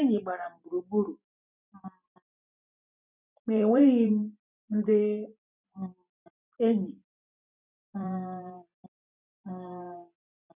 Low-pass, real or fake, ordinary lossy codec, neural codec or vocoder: 3.6 kHz; fake; none; vocoder, 44.1 kHz, 128 mel bands every 512 samples, BigVGAN v2